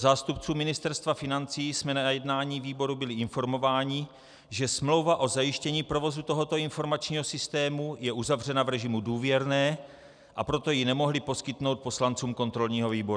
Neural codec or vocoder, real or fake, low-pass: none; real; 9.9 kHz